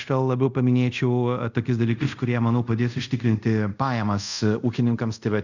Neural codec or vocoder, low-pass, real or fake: codec, 24 kHz, 0.5 kbps, DualCodec; 7.2 kHz; fake